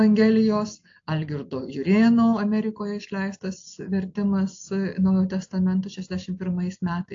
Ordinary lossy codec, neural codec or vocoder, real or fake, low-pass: AAC, 48 kbps; none; real; 7.2 kHz